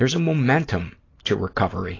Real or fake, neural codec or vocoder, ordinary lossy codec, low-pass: real; none; AAC, 32 kbps; 7.2 kHz